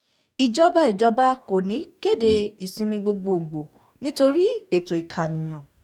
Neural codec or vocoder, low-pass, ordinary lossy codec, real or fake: codec, 44.1 kHz, 2.6 kbps, DAC; 19.8 kHz; none; fake